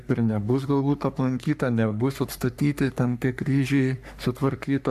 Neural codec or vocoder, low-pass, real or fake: codec, 44.1 kHz, 3.4 kbps, Pupu-Codec; 14.4 kHz; fake